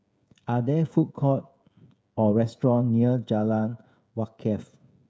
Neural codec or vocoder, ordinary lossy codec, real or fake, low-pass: codec, 16 kHz, 16 kbps, FreqCodec, smaller model; none; fake; none